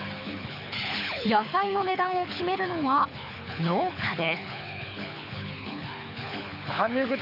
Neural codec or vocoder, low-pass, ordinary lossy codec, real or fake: codec, 24 kHz, 6 kbps, HILCodec; 5.4 kHz; none; fake